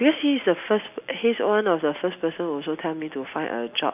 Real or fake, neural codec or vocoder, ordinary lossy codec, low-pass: real; none; AAC, 32 kbps; 3.6 kHz